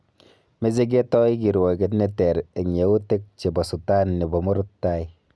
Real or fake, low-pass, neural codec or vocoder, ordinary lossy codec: real; none; none; none